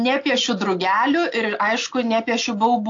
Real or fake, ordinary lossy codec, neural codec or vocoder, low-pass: real; AAC, 48 kbps; none; 7.2 kHz